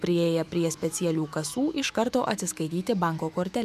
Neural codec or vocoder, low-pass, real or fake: autoencoder, 48 kHz, 128 numbers a frame, DAC-VAE, trained on Japanese speech; 14.4 kHz; fake